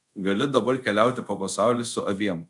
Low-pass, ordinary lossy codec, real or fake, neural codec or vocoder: 10.8 kHz; MP3, 96 kbps; fake; codec, 24 kHz, 0.5 kbps, DualCodec